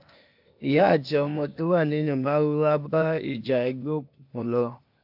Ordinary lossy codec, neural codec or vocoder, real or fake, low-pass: AAC, 48 kbps; codec, 16 kHz, 0.8 kbps, ZipCodec; fake; 5.4 kHz